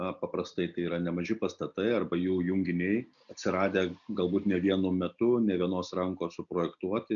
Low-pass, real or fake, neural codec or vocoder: 7.2 kHz; real; none